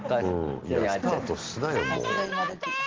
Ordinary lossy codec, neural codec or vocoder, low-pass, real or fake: Opus, 16 kbps; none; 7.2 kHz; real